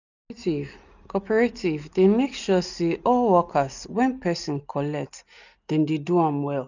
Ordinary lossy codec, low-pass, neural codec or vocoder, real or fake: none; 7.2 kHz; none; real